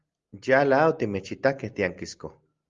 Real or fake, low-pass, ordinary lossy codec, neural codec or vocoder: real; 7.2 kHz; Opus, 32 kbps; none